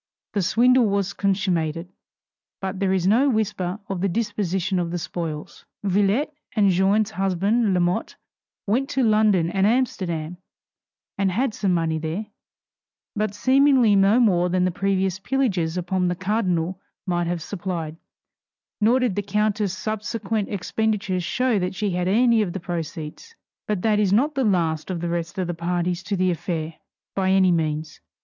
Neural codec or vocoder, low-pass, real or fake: none; 7.2 kHz; real